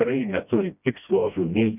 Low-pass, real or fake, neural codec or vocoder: 3.6 kHz; fake; codec, 16 kHz, 1 kbps, FreqCodec, smaller model